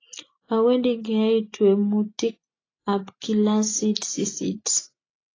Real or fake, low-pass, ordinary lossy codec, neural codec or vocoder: real; 7.2 kHz; AAC, 32 kbps; none